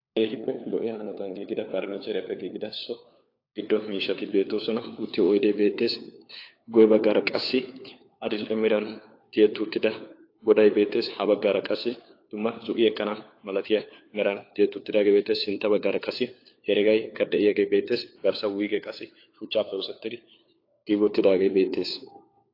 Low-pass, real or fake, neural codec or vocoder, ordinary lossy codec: 5.4 kHz; fake; codec, 16 kHz, 4 kbps, FunCodec, trained on LibriTTS, 50 frames a second; AAC, 32 kbps